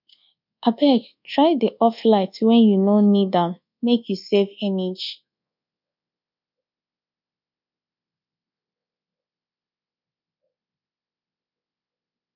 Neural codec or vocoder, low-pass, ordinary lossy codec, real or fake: codec, 24 kHz, 1.2 kbps, DualCodec; 5.4 kHz; none; fake